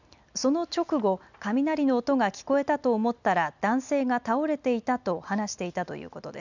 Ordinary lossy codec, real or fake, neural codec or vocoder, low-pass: none; real; none; 7.2 kHz